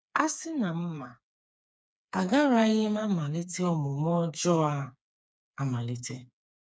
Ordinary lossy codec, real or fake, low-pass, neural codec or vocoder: none; fake; none; codec, 16 kHz, 4 kbps, FreqCodec, smaller model